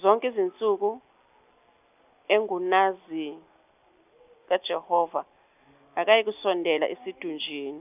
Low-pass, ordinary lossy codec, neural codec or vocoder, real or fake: 3.6 kHz; none; none; real